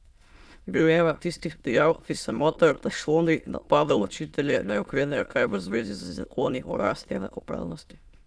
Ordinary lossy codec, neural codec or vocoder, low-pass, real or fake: none; autoencoder, 22.05 kHz, a latent of 192 numbers a frame, VITS, trained on many speakers; none; fake